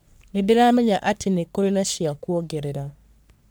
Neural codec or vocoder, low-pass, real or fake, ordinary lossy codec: codec, 44.1 kHz, 3.4 kbps, Pupu-Codec; none; fake; none